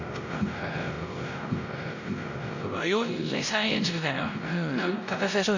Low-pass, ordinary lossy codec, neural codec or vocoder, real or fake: 7.2 kHz; none; codec, 16 kHz, 0.5 kbps, X-Codec, WavLM features, trained on Multilingual LibriSpeech; fake